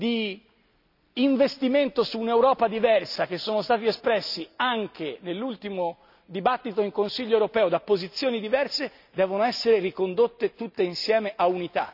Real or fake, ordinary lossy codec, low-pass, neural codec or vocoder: real; none; 5.4 kHz; none